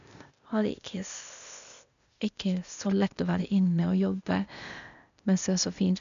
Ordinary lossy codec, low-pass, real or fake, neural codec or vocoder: none; 7.2 kHz; fake; codec, 16 kHz, 0.8 kbps, ZipCodec